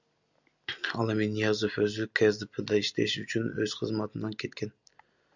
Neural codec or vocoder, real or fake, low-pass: none; real; 7.2 kHz